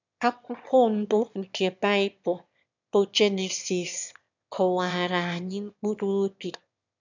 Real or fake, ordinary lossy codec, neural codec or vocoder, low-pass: fake; none; autoencoder, 22.05 kHz, a latent of 192 numbers a frame, VITS, trained on one speaker; 7.2 kHz